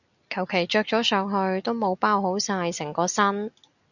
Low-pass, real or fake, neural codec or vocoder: 7.2 kHz; real; none